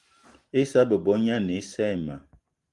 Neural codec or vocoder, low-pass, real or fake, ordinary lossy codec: none; 10.8 kHz; real; Opus, 24 kbps